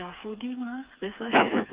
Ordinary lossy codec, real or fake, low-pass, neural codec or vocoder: Opus, 32 kbps; fake; 3.6 kHz; codec, 16 kHz, 4 kbps, FreqCodec, smaller model